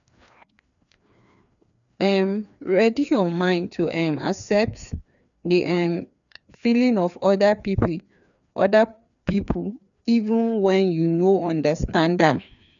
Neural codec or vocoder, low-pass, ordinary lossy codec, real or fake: codec, 16 kHz, 2 kbps, FreqCodec, larger model; 7.2 kHz; none; fake